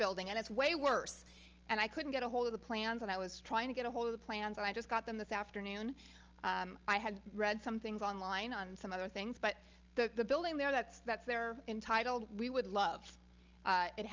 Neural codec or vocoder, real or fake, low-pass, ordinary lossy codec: none; real; 7.2 kHz; Opus, 24 kbps